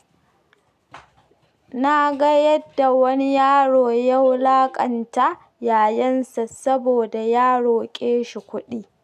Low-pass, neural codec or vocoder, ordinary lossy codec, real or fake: 14.4 kHz; none; none; real